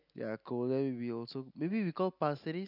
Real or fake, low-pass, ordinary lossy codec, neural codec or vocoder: real; 5.4 kHz; none; none